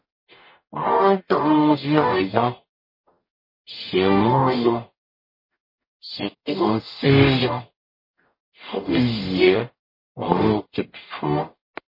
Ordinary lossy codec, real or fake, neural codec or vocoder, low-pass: MP3, 24 kbps; fake; codec, 44.1 kHz, 0.9 kbps, DAC; 5.4 kHz